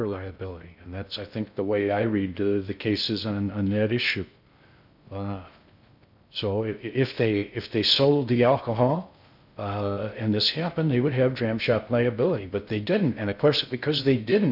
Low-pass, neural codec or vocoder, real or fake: 5.4 kHz; codec, 16 kHz in and 24 kHz out, 0.6 kbps, FocalCodec, streaming, 2048 codes; fake